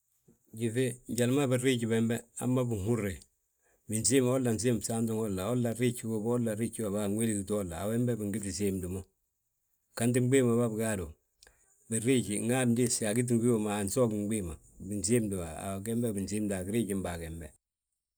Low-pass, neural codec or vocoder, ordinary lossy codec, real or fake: none; none; none; real